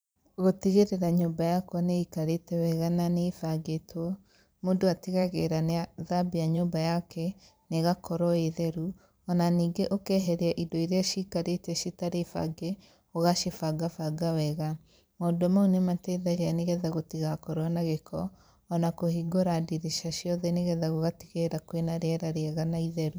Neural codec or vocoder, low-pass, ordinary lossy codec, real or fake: vocoder, 44.1 kHz, 128 mel bands every 512 samples, BigVGAN v2; none; none; fake